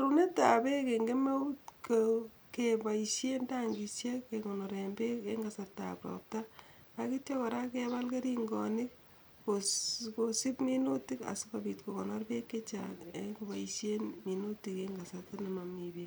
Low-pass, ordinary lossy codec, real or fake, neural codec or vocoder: none; none; real; none